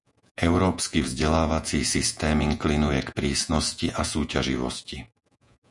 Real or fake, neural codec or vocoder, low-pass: fake; vocoder, 48 kHz, 128 mel bands, Vocos; 10.8 kHz